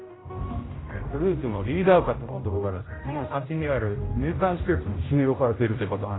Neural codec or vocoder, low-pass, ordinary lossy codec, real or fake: codec, 16 kHz, 0.5 kbps, X-Codec, HuBERT features, trained on general audio; 7.2 kHz; AAC, 16 kbps; fake